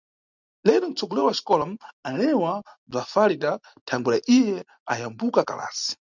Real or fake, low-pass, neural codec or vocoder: real; 7.2 kHz; none